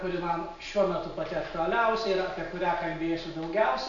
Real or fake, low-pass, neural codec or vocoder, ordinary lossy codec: real; 7.2 kHz; none; AAC, 48 kbps